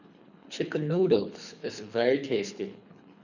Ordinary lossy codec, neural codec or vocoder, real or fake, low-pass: none; codec, 24 kHz, 3 kbps, HILCodec; fake; 7.2 kHz